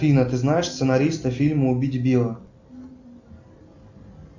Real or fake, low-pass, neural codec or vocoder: real; 7.2 kHz; none